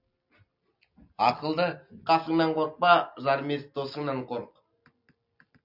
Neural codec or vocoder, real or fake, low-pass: none; real; 5.4 kHz